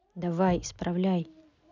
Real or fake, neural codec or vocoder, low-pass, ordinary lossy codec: real; none; 7.2 kHz; none